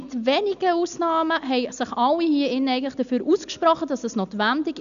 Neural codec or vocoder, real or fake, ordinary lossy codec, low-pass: none; real; none; 7.2 kHz